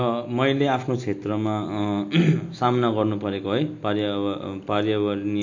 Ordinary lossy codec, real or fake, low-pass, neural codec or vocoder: MP3, 48 kbps; real; 7.2 kHz; none